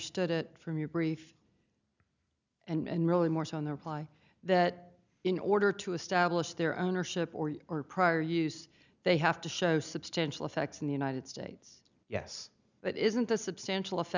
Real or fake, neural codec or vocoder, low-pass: real; none; 7.2 kHz